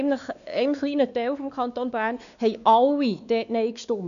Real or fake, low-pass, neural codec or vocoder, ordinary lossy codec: fake; 7.2 kHz; codec, 16 kHz, 2 kbps, X-Codec, WavLM features, trained on Multilingual LibriSpeech; none